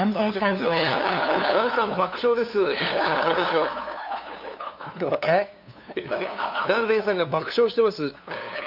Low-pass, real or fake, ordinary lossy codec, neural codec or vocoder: 5.4 kHz; fake; none; codec, 16 kHz, 2 kbps, FunCodec, trained on LibriTTS, 25 frames a second